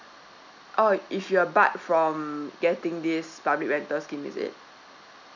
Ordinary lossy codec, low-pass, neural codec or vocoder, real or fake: none; 7.2 kHz; none; real